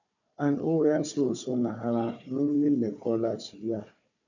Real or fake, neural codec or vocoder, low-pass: fake; codec, 16 kHz, 4 kbps, FunCodec, trained on Chinese and English, 50 frames a second; 7.2 kHz